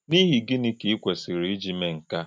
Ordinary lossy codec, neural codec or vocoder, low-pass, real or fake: none; none; none; real